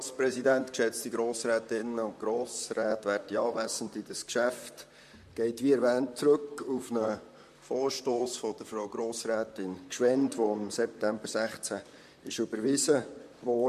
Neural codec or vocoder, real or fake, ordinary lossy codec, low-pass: vocoder, 44.1 kHz, 128 mel bands, Pupu-Vocoder; fake; MP3, 64 kbps; 14.4 kHz